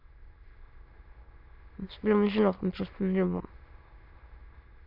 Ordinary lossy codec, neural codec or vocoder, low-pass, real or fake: AAC, 32 kbps; autoencoder, 22.05 kHz, a latent of 192 numbers a frame, VITS, trained on many speakers; 5.4 kHz; fake